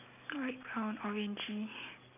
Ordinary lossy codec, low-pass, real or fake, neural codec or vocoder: none; 3.6 kHz; real; none